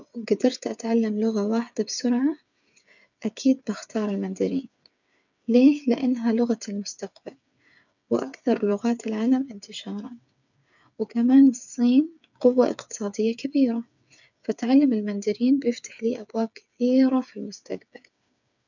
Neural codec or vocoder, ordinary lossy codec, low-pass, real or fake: codec, 16 kHz, 8 kbps, FreqCodec, smaller model; none; 7.2 kHz; fake